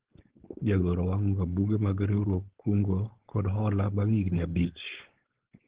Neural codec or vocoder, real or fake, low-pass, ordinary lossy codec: codec, 16 kHz, 4.8 kbps, FACodec; fake; 3.6 kHz; Opus, 16 kbps